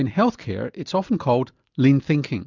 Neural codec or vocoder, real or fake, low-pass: none; real; 7.2 kHz